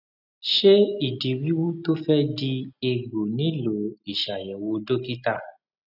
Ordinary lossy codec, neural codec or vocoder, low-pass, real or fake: none; none; 5.4 kHz; real